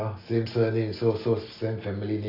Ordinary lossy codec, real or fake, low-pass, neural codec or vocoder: AAC, 24 kbps; real; 5.4 kHz; none